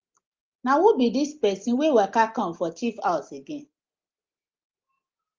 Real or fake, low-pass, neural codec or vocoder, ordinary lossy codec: real; 7.2 kHz; none; Opus, 32 kbps